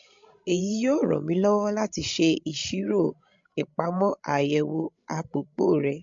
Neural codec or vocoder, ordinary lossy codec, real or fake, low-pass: codec, 16 kHz, 16 kbps, FreqCodec, larger model; MP3, 48 kbps; fake; 7.2 kHz